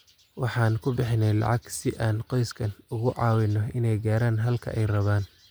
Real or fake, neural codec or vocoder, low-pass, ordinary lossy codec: real; none; none; none